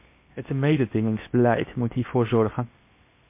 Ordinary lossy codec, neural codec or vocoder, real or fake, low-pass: MP3, 24 kbps; codec, 16 kHz in and 24 kHz out, 0.8 kbps, FocalCodec, streaming, 65536 codes; fake; 3.6 kHz